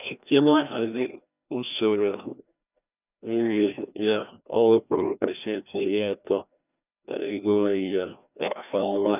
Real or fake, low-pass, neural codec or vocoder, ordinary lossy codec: fake; 3.6 kHz; codec, 16 kHz, 1 kbps, FreqCodec, larger model; none